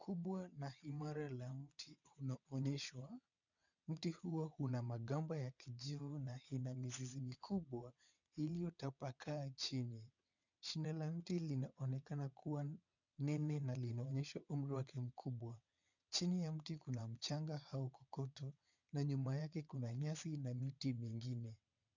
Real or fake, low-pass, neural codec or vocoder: fake; 7.2 kHz; vocoder, 22.05 kHz, 80 mel bands, WaveNeXt